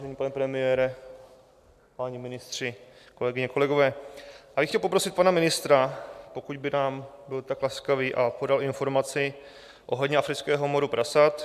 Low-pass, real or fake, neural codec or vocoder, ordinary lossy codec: 14.4 kHz; real; none; MP3, 96 kbps